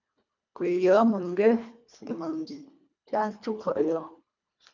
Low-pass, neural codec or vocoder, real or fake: 7.2 kHz; codec, 24 kHz, 1.5 kbps, HILCodec; fake